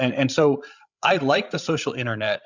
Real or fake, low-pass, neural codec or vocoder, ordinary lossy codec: fake; 7.2 kHz; codec, 16 kHz, 16 kbps, FreqCodec, larger model; Opus, 64 kbps